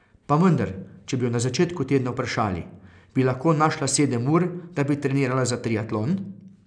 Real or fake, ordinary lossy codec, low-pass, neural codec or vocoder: real; none; 9.9 kHz; none